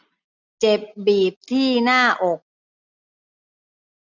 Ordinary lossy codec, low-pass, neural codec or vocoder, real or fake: none; 7.2 kHz; none; real